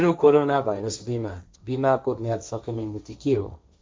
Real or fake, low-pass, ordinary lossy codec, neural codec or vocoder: fake; none; none; codec, 16 kHz, 1.1 kbps, Voila-Tokenizer